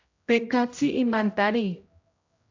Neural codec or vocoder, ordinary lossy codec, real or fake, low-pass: codec, 16 kHz, 0.5 kbps, X-Codec, HuBERT features, trained on general audio; AAC, 48 kbps; fake; 7.2 kHz